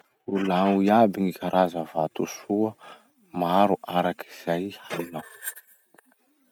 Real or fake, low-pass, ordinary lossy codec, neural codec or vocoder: real; 19.8 kHz; none; none